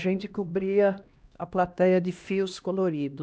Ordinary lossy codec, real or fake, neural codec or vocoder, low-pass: none; fake; codec, 16 kHz, 1 kbps, X-Codec, HuBERT features, trained on LibriSpeech; none